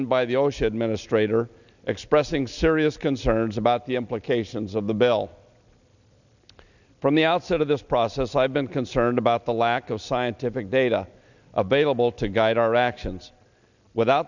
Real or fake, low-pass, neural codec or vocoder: real; 7.2 kHz; none